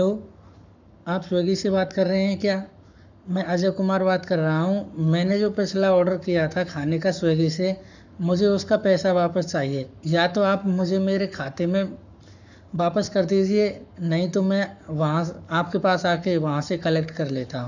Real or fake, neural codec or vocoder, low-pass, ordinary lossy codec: fake; codec, 44.1 kHz, 7.8 kbps, Pupu-Codec; 7.2 kHz; none